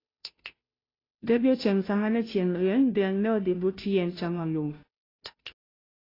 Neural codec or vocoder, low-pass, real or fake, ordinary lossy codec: codec, 16 kHz, 0.5 kbps, FunCodec, trained on Chinese and English, 25 frames a second; 5.4 kHz; fake; AAC, 24 kbps